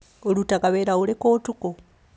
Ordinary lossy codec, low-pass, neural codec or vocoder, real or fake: none; none; none; real